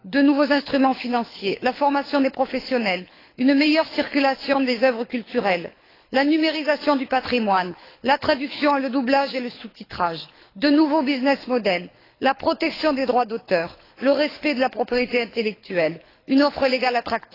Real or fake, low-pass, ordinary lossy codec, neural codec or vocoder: fake; 5.4 kHz; AAC, 24 kbps; codec, 24 kHz, 6 kbps, HILCodec